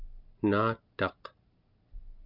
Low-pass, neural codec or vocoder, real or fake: 5.4 kHz; none; real